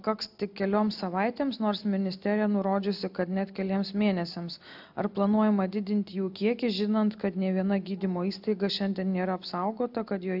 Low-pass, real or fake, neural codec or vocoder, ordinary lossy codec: 5.4 kHz; real; none; AAC, 48 kbps